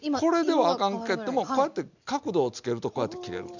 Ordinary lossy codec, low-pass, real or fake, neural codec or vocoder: none; 7.2 kHz; real; none